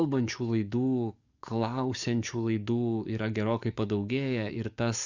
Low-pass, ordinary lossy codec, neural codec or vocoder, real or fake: 7.2 kHz; Opus, 64 kbps; codec, 44.1 kHz, 7.8 kbps, DAC; fake